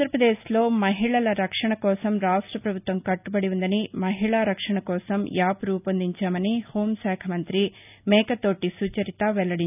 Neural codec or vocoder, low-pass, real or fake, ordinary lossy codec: none; 3.6 kHz; real; none